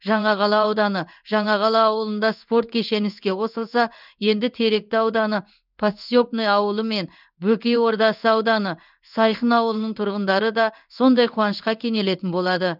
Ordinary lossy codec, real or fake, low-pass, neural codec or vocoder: none; fake; 5.4 kHz; codec, 16 kHz in and 24 kHz out, 1 kbps, XY-Tokenizer